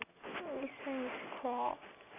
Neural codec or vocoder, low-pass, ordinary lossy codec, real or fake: none; 3.6 kHz; none; real